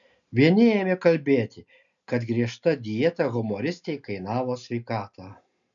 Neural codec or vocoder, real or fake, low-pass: none; real; 7.2 kHz